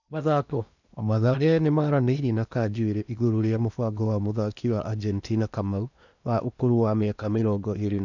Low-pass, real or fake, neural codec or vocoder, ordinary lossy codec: 7.2 kHz; fake; codec, 16 kHz in and 24 kHz out, 0.8 kbps, FocalCodec, streaming, 65536 codes; none